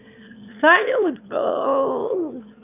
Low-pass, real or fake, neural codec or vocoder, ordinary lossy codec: 3.6 kHz; fake; autoencoder, 22.05 kHz, a latent of 192 numbers a frame, VITS, trained on one speaker; none